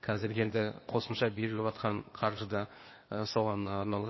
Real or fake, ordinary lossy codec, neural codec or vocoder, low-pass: fake; MP3, 24 kbps; codec, 16 kHz, 0.8 kbps, ZipCodec; 7.2 kHz